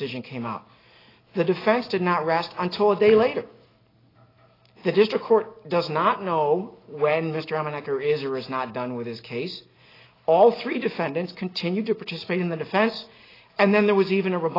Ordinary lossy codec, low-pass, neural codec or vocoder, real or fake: AAC, 24 kbps; 5.4 kHz; none; real